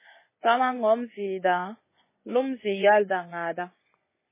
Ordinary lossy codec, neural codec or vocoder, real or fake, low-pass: MP3, 16 kbps; vocoder, 24 kHz, 100 mel bands, Vocos; fake; 3.6 kHz